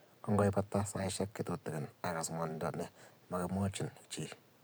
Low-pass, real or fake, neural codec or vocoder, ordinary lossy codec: none; fake; vocoder, 44.1 kHz, 128 mel bands every 512 samples, BigVGAN v2; none